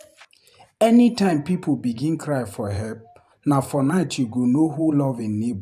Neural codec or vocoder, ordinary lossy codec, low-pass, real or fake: vocoder, 44.1 kHz, 128 mel bands every 512 samples, BigVGAN v2; none; 14.4 kHz; fake